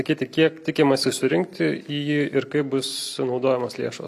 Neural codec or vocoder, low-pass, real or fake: none; 14.4 kHz; real